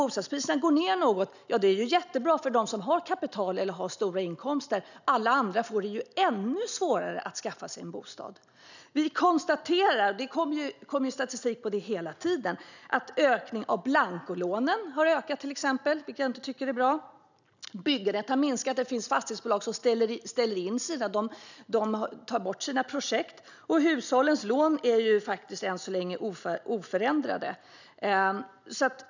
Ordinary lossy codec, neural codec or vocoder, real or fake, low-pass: none; none; real; 7.2 kHz